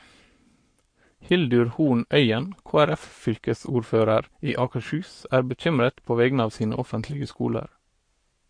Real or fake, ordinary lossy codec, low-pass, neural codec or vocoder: fake; MP3, 48 kbps; 9.9 kHz; codec, 44.1 kHz, 7.8 kbps, Pupu-Codec